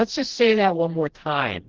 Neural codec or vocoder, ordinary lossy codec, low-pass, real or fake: codec, 16 kHz, 1 kbps, FreqCodec, smaller model; Opus, 16 kbps; 7.2 kHz; fake